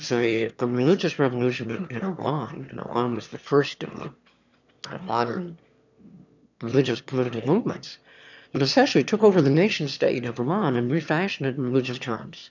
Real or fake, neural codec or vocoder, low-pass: fake; autoencoder, 22.05 kHz, a latent of 192 numbers a frame, VITS, trained on one speaker; 7.2 kHz